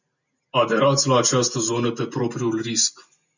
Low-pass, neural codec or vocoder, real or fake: 7.2 kHz; none; real